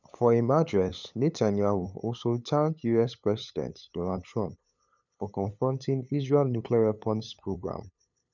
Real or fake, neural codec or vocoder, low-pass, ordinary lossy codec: fake; codec, 16 kHz, 8 kbps, FunCodec, trained on LibriTTS, 25 frames a second; 7.2 kHz; none